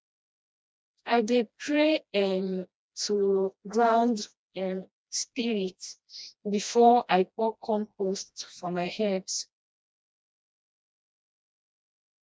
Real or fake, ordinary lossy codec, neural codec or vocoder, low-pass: fake; none; codec, 16 kHz, 1 kbps, FreqCodec, smaller model; none